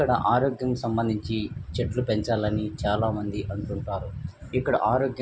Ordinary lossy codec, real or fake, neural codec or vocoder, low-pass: none; real; none; none